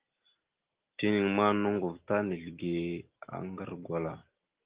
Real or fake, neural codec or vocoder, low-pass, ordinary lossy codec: real; none; 3.6 kHz; Opus, 16 kbps